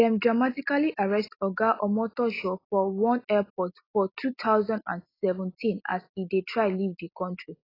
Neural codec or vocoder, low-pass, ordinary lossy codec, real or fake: none; 5.4 kHz; AAC, 24 kbps; real